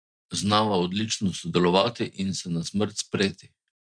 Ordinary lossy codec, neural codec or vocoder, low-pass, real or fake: none; none; 9.9 kHz; real